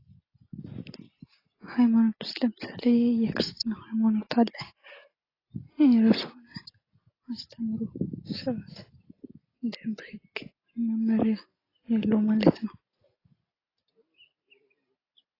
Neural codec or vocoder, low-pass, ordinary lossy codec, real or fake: none; 5.4 kHz; AAC, 24 kbps; real